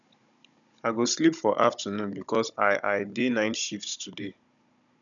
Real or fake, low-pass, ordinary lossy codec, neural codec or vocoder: fake; 7.2 kHz; none; codec, 16 kHz, 16 kbps, FunCodec, trained on Chinese and English, 50 frames a second